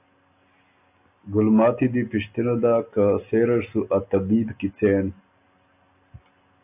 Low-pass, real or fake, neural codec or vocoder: 3.6 kHz; real; none